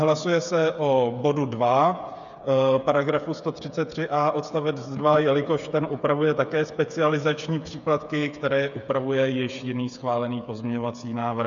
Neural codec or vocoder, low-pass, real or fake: codec, 16 kHz, 8 kbps, FreqCodec, smaller model; 7.2 kHz; fake